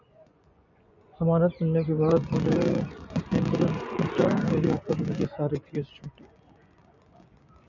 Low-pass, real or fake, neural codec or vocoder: 7.2 kHz; fake; vocoder, 24 kHz, 100 mel bands, Vocos